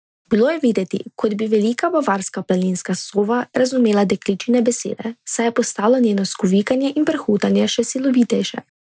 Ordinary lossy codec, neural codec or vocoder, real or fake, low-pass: none; none; real; none